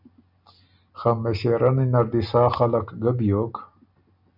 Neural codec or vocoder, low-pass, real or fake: none; 5.4 kHz; real